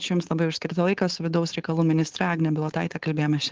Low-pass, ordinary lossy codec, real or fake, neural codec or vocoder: 7.2 kHz; Opus, 16 kbps; fake; codec, 16 kHz, 4.8 kbps, FACodec